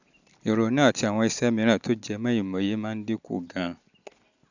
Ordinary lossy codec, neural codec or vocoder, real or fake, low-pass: none; none; real; 7.2 kHz